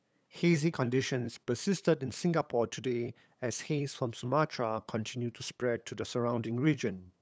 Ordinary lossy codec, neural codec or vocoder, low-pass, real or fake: none; codec, 16 kHz, 8 kbps, FunCodec, trained on LibriTTS, 25 frames a second; none; fake